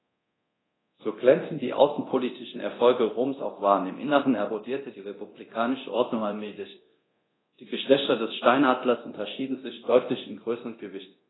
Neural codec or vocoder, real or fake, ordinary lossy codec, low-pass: codec, 24 kHz, 0.9 kbps, DualCodec; fake; AAC, 16 kbps; 7.2 kHz